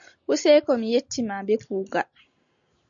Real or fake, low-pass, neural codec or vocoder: real; 7.2 kHz; none